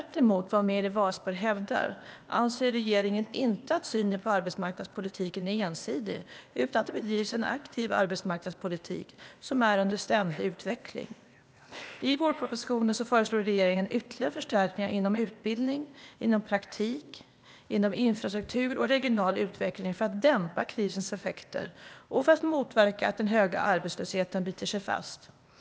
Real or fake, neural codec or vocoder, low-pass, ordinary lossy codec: fake; codec, 16 kHz, 0.8 kbps, ZipCodec; none; none